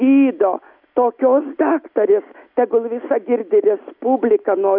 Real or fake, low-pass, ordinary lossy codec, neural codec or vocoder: real; 5.4 kHz; AAC, 48 kbps; none